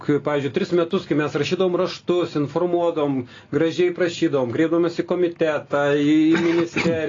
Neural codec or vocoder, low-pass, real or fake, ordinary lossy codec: none; 7.2 kHz; real; AAC, 32 kbps